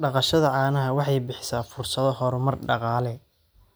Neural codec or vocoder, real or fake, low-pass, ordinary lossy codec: none; real; none; none